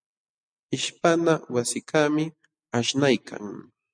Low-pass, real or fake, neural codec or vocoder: 9.9 kHz; real; none